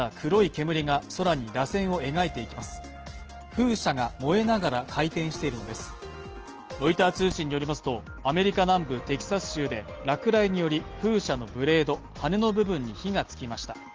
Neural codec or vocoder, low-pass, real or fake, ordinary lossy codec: none; 7.2 kHz; real; Opus, 16 kbps